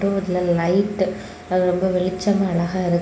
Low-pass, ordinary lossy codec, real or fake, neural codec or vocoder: none; none; real; none